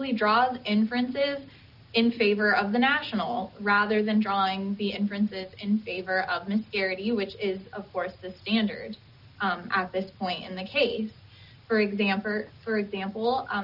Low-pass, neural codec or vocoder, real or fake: 5.4 kHz; none; real